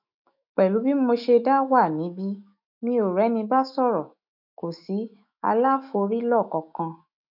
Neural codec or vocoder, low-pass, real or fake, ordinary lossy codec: autoencoder, 48 kHz, 128 numbers a frame, DAC-VAE, trained on Japanese speech; 5.4 kHz; fake; none